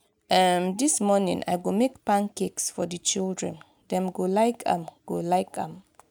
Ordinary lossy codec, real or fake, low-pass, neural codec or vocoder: none; real; none; none